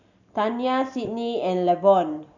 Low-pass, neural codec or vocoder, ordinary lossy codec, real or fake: 7.2 kHz; none; none; real